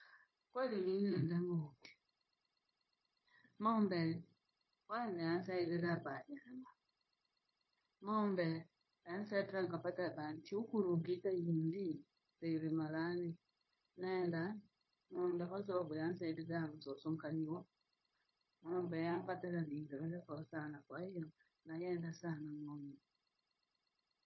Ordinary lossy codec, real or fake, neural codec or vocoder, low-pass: MP3, 24 kbps; fake; codec, 16 kHz, 0.9 kbps, LongCat-Audio-Codec; 7.2 kHz